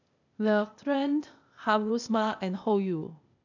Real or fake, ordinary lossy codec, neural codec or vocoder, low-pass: fake; none; codec, 16 kHz, 0.8 kbps, ZipCodec; 7.2 kHz